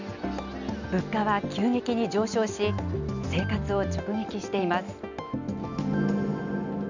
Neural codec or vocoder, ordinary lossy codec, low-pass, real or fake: none; none; 7.2 kHz; real